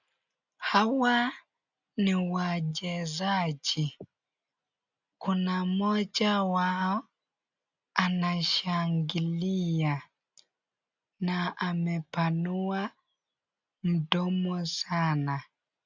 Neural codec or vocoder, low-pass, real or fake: none; 7.2 kHz; real